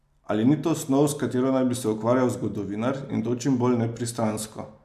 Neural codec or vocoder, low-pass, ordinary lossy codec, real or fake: none; 14.4 kHz; AAC, 96 kbps; real